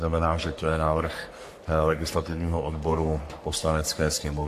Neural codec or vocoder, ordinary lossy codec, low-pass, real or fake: codec, 44.1 kHz, 3.4 kbps, Pupu-Codec; AAC, 64 kbps; 14.4 kHz; fake